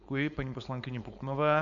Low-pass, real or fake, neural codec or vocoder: 7.2 kHz; fake; codec, 16 kHz, 4.8 kbps, FACodec